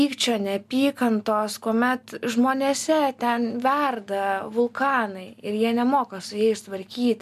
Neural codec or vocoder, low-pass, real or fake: none; 14.4 kHz; real